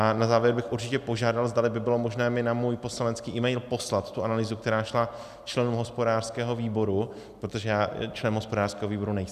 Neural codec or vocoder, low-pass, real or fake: none; 14.4 kHz; real